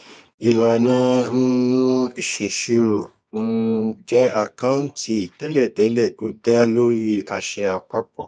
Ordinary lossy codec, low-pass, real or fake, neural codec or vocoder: none; 9.9 kHz; fake; codec, 24 kHz, 0.9 kbps, WavTokenizer, medium music audio release